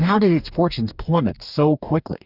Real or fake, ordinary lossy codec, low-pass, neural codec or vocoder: fake; Opus, 64 kbps; 5.4 kHz; codec, 44.1 kHz, 2.6 kbps, DAC